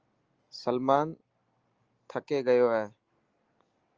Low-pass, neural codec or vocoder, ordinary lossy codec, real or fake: 7.2 kHz; none; Opus, 24 kbps; real